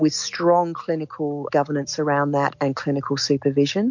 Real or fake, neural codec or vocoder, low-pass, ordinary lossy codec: real; none; 7.2 kHz; MP3, 48 kbps